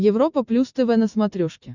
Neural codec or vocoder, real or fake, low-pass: none; real; 7.2 kHz